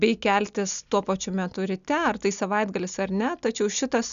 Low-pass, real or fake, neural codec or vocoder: 7.2 kHz; real; none